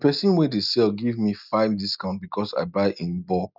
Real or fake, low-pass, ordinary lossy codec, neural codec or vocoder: real; 5.4 kHz; none; none